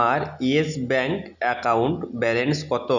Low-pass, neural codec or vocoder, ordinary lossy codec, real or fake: 7.2 kHz; none; none; real